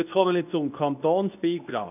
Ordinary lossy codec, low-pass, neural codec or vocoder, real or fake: none; 3.6 kHz; codec, 24 kHz, 0.9 kbps, WavTokenizer, medium speech release version 2; fake